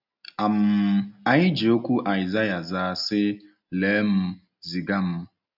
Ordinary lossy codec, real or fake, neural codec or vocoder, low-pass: none; real; none; 5.4 kHz